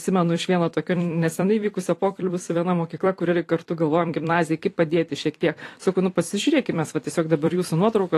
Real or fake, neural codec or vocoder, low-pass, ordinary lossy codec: fake; vocoder, 44.1 kHz, 128 mel bands every 256 samples, BigVGAN v2; 14.4 kHz; AAC, 48 kbps